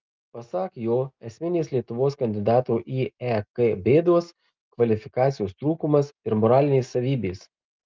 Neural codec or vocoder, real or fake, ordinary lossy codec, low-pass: none; real; Opus, 24 kbps; 7.2 kHz